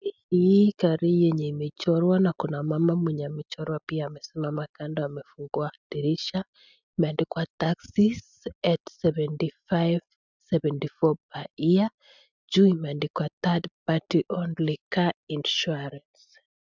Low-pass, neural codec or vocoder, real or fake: 7.2 kHz; none; real